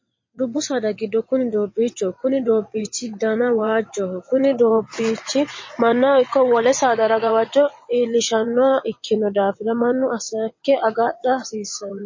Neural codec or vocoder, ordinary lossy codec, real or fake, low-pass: vocoder, 22.05 kHz, 80 mel bands, WaveNeXt; MP3, 32 kbps; fake; 7.2 kHz